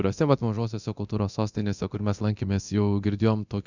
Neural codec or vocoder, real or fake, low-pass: codec, 24 kHz, 0.9 kbps, DualCodec; fake; 7.2 kHz